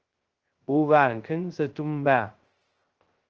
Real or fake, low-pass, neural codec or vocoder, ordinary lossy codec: fake; 7.2 kHz; codec, 16 kHz, 0.2 kbps, FocalCodec; Opus, 16 kbps